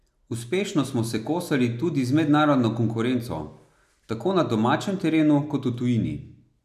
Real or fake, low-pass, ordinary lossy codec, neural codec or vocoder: real; 14.4 kHz; none; none